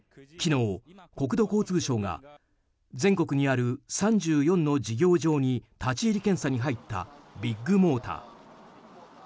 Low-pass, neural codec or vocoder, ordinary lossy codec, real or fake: none; none; none; real